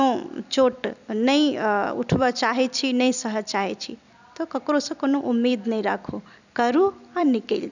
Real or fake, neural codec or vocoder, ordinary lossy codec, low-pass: fake; vocoder, 44.1 kHz, 128 mel bands every 256 samples, BigVGAN v2; none; 7.2 kHz